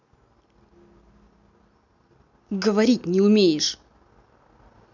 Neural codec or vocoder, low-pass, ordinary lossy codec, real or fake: none; 7.2 kHz; none; real